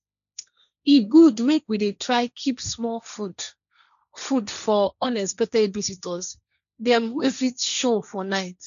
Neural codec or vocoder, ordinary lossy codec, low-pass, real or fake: codec, 16 kHz, 1.1 kbps, Voila-Tokenizer; none; 7.2 kHz; fake